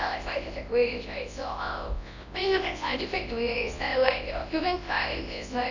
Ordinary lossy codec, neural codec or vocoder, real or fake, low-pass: none; codec, 24 kHz, 0.9 kbps, WavTokenizer, large speech release; fake; 7.2 kHz